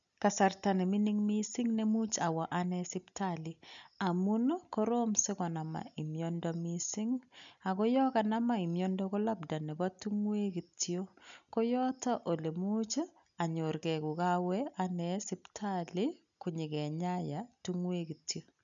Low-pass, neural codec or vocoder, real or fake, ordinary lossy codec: 7.2 kHz; none; real; none